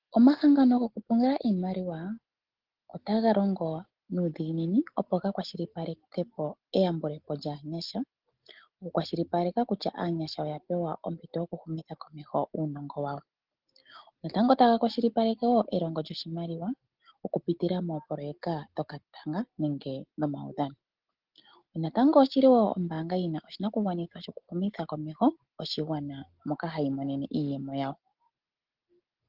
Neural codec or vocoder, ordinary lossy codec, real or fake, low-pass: none; Opus, 16 kbps; real; 5.4 kHz